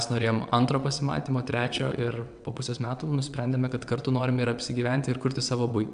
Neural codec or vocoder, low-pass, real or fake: vocoder, 22.05 kHz, 80 mel bands, WaveNeXt; 9.9 kHz; fake